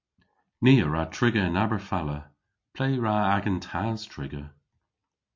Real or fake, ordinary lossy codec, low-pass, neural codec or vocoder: real; MP3, 48 kbps; 7.2 kHz; none